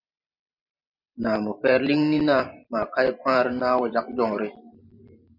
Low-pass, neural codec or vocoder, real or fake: 5.4 kHz; vocoder, 44.1 kHz, 128 mel bands every 512 samples, BigVGAN v2; fake